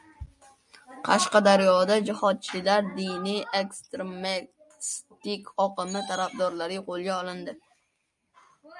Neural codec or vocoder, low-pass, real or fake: none; 10.8 kHz; real